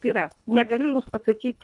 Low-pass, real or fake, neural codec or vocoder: 10.8 kHz; fake; codec, 24 kHz, 1.5 kbps, HILCodec